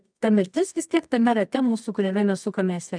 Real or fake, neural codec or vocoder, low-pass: fake; codec, 24 kHz, 0.9 kbps, WavTokenizer, medium music audio release; 9.9 kHz